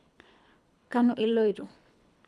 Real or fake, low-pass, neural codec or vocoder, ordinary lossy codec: fake; none; codec, 24 kHz, 3 kbps, HILCodec; none